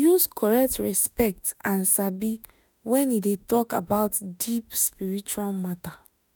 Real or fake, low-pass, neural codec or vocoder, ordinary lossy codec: fake; none; autoencoder, 48 kHz, 32 numbers a frame, DAC-VAE, trained on Japanese speech; none